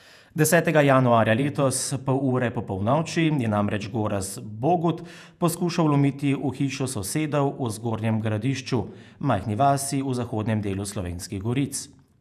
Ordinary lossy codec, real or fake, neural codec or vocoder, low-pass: none; fake; vocoder, 48 kHz, 128 mel bands, Vocos; 14.4 kHz